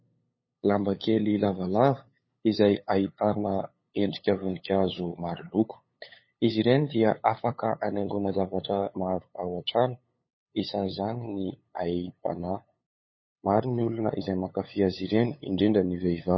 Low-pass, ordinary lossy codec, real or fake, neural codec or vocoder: 7.2 kHz; MP3, 24 kbps; fake; codec, 16 kHz, 8 kbps, FunCodec, trained on LibriTTS, 25 frames a second